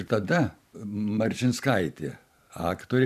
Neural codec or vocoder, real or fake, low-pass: vocoder, 44.1 kHz, 128 mel bands every 512 samples, BigVGAN v2; fake; 14.4 kHz